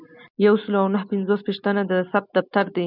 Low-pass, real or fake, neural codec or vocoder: 5.4 kHz; real; none